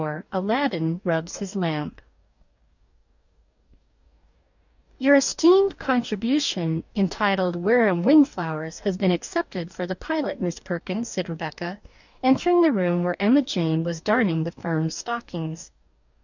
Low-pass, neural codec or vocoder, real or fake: 7.2 kHz; codec, 44.1 kHz, 2.6 kbps, DAC; fake